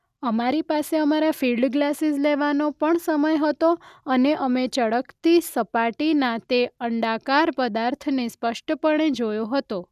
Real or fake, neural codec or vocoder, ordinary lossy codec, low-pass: real; none; none; 14.4 kHz